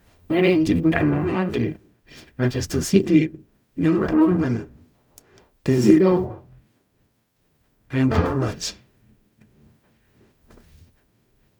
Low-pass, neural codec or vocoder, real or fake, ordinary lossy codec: 19.8 kHz; codec, 44.1 kHz, 0.9 kbps, DAC; fake; none